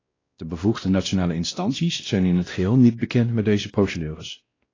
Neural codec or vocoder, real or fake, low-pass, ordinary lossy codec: codec, 16 kHz, 1 kbps, X-Codec, WavLM features, trained on Multilingual LibriSpeech; fake; 7.2 kHz; AAC, 32 kbps